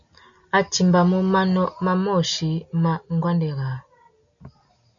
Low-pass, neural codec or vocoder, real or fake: 7.2 kHz; none; real